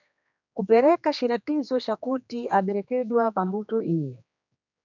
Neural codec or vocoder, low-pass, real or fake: codec, 16 kHz, 2 kbps, X-Codec, HuBERT features, trained on general audio; 7.2 kHz; fake